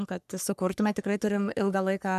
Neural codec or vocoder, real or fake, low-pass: codec, 44.1 kHz, 3.4 kbps, Pupu-Codec; fake; 14.4 kHz